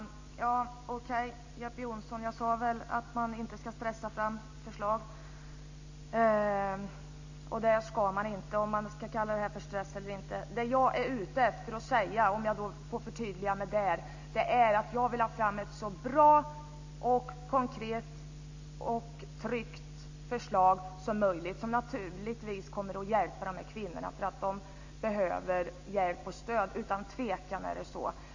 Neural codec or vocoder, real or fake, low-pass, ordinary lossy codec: none; real; 7.2 kHz; AAC, 48 kbps